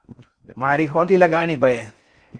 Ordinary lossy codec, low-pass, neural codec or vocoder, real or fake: Opus, 64 kbps; 9.9 kHz; codec, 16 kHz in and 24 kHz out, 0.6 kbps, FocalCodec, streaming, 4096 codes; fake